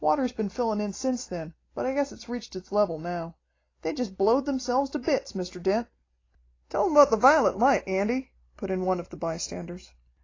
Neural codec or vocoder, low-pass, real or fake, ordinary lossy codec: none; 7.2 kHz; real; AAC, 32 kbps